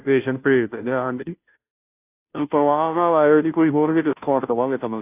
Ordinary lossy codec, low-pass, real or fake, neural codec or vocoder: AAC, 32 kbps; 3.6 kHz; fake; codec, 16 kHz, 0.5 kbps, FunCodec, trained on Chinese and English, 25 frames a second